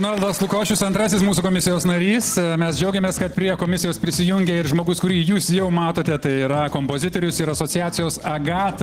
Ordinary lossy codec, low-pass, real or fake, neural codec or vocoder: Opus, 16 kbps; 14.4 kHz; real; none